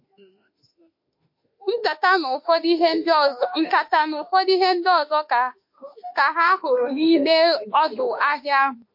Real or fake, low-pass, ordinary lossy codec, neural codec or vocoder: fake; 5.4 kHz; MP3, 32 kbps; autoencoder, 48 kHz, 32 numbers a frame, DAC-VAE, trained on Japanese speech